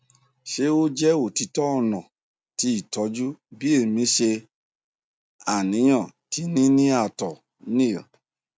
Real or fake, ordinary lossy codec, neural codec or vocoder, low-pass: real; none; none; none